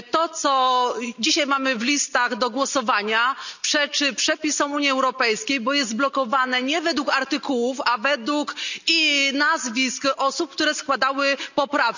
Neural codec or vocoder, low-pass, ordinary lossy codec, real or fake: none; 7.2 kHz; none; real